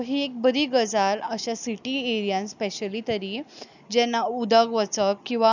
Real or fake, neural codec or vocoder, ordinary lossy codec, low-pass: real; none; none; 7.2 kHz